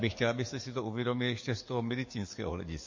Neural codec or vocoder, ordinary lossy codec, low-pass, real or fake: codec, 44.1 kHz, 7.8 kbps, DAC; MP3, 32 kbps; 7.2 kHz; fake